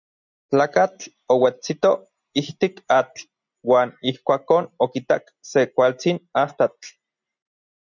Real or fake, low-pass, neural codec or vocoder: real; 7.2 kHz; none